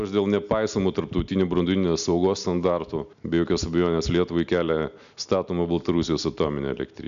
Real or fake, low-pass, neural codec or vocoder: real; 7.2 kHz; none